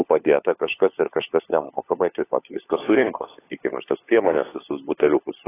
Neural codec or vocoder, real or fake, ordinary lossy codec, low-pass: codec, 16 kHz, 4 kbps, FunCodec, trained on LibriTTS, 50 frames a second; fake; AAC, 16 kbps; 3.6 kHz